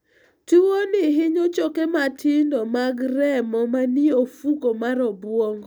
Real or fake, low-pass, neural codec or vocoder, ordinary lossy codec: real; none; none; none